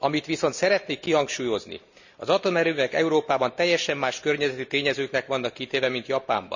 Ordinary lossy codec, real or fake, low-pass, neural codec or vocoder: none; real; 7.2 kHz; none